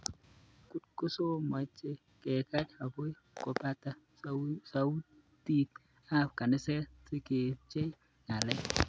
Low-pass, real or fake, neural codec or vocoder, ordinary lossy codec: none; real; none; none